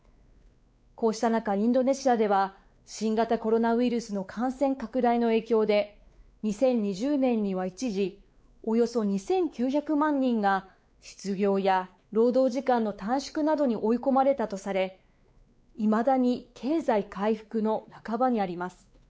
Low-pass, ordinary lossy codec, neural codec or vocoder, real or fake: none; none; codec, 16 kHz, 4 kbps, X-Codec, WavLM features, trained on Multilingual LibriSpeech; fake